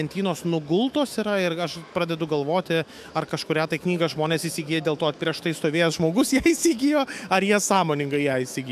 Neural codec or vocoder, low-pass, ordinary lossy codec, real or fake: autoencoder, 48 kHz, 128 numbers a frame, DAC-VAE, trained on Japanese speech; 14.4 kHz; AAC, 96 kbps; fake